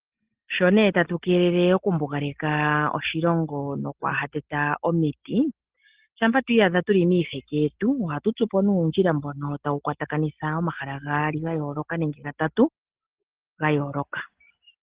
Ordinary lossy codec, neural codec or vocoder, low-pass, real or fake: Opus, 16 kbps; none; 3.6 kHz; real